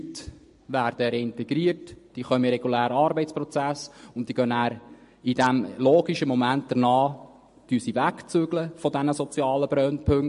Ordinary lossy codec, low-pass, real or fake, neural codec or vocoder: MP3, 48 kbps; 10.8 kHz; real; none